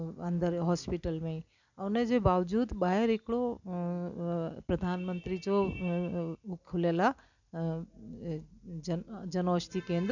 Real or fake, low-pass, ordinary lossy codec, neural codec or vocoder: real; 7.2 kHz; none; none